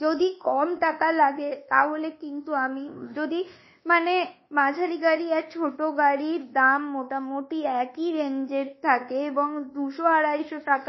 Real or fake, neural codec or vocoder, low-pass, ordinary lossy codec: fake; codec, 24 kHz, 1.2 kbps, DualCodec; 7.2 kHz; MP3, 24 kbps